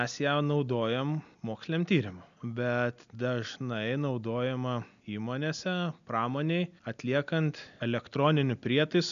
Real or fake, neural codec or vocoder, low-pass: real; none; 7.2 kHz